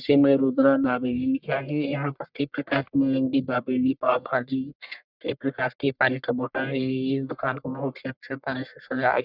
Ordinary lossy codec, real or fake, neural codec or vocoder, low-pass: none; fake; codec, 44.1 kHz, 1.7 kbps, Pupu-Codec; 5.4 kHz